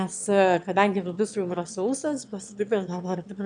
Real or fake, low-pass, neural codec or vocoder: fake; 9.9 kHz; autoencoder, 22.05 kHz, a latent of 192 numbers a frame, VITS, trained on one speaker